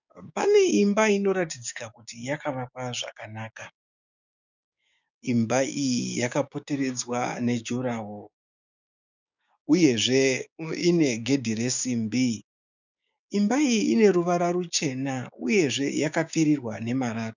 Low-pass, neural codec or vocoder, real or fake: 7.2 kHz; codec, 16 kHz, 6 kbps, DAC; fake